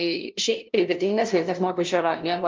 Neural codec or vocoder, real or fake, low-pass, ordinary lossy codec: codec, 16 kHz, 0.5 kbps, FunCodec, trained on LibriTTS, 25 frames a second; fake; 7.2 kHz; Opus, 24 kbps